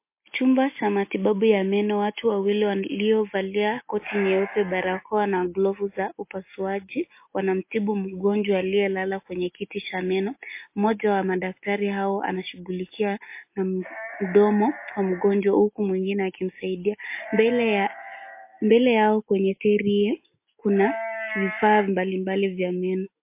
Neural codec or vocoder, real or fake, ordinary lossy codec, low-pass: none; real; MP3, 24 kbps; 3.6 kHz